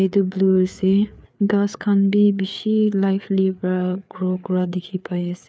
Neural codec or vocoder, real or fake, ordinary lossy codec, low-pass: codec, 16 kHz, 4 kbps, FreqCodec, larger model; fake; none; none